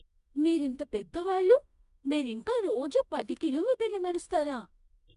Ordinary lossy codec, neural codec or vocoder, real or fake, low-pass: none; codec, 24 kHz, 0.9 kbps, WavTokenizer, medium music audio release; fake; 10.8 kHz